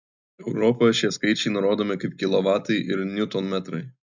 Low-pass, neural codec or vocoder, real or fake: 7.2 kHz; none; real